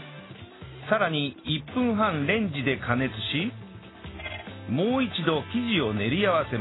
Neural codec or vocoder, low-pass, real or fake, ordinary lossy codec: none; 7.2 kHz; real; AAC, 16 kbps